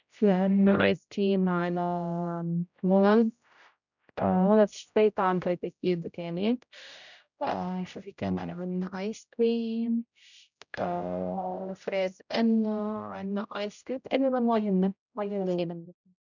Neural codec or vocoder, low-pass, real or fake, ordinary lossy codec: codec, 16 kHz, 0.5 kbps, X-Codec, HuBERT features, trained on general audio; 7.2 kHz; fake; none